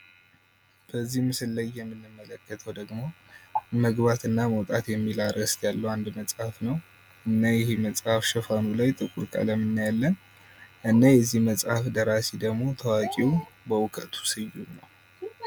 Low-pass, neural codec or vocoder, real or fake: 19.8 kHz; none; real